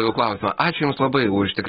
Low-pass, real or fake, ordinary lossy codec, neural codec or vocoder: 14.4 kHz; real; AAC, 16 kbps; none